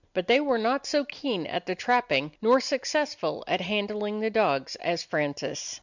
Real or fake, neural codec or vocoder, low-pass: real; none; 7.2 kHz